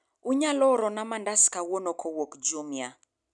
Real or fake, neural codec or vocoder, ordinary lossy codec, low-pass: real; none; none; 10.8 kHz